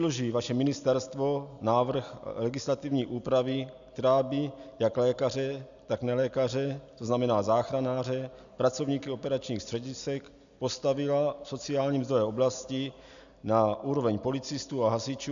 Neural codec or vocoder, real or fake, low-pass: none; real; 7.2 kHz